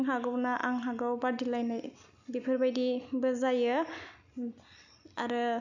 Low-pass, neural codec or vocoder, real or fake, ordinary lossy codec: 7.2 kHz; none; real; none